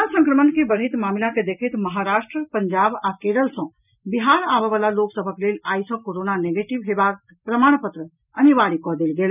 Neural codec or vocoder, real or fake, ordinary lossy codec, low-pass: none; real; none; 3.6 kHz